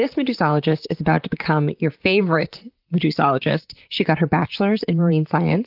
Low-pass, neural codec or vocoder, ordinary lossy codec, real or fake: 5.4 kHz; vocoder, 44.1 kHz, 128 mel bands, Pupu-Vocoder; Opus, 32 kbps; fake